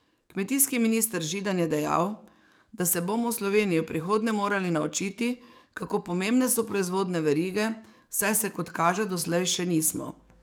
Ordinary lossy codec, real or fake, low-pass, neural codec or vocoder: none; fake; none; codec, 44.1 kHz, 7.8 kbps, DAC